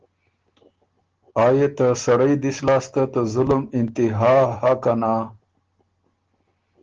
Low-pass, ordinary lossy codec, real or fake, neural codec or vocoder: 7.2 kHz; Opus, 16 kbps; real; none